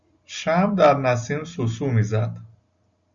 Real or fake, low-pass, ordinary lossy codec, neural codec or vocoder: real; 7.2 kHz; Opus, 64 kbps; none